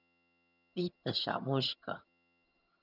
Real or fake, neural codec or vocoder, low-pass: fake; vocoder, 22.05 kHz, 80 mel bands, HiFi-GAN; 5.4 kHz